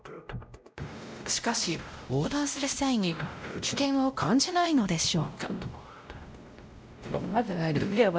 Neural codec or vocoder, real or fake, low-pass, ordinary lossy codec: codec, 16 kHz, 0.5 kbps, X-Codec, WavLM features, trained on Multilingual LibriSpeech; fake; none; none